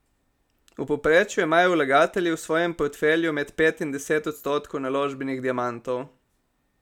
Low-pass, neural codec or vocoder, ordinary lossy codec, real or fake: 19.8 kHz; none; none; real